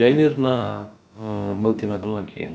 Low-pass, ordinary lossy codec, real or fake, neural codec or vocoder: none; none; fake; codec, 16 kHz, about 1 kbps, DyCAST, with the encoder's durations